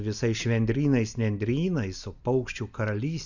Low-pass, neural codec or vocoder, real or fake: 7.2 kHz; none; real